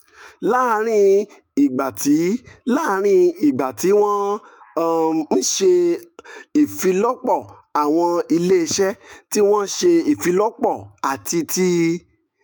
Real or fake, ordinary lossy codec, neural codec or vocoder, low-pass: fake; none; autoencoder, 48 kHz, 128 numbers a frame, DAC-VAE, trained on Japanese speech; none